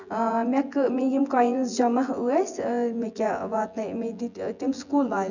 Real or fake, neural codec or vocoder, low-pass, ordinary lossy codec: fake; vocoder, 24 kHz, 100 mel bands, Vocos; 7.2 kHz; none